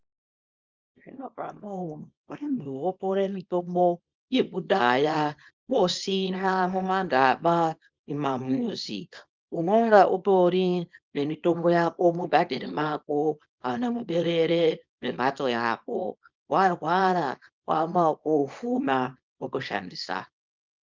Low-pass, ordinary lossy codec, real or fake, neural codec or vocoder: 7.2 kHz; Opus, 32 kbps; fake; codec, 24 kHz, 0.9 kbps, WavTokenizer, small release